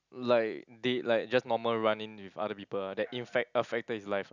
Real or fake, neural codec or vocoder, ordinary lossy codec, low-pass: real; none; none; 7.2 kHz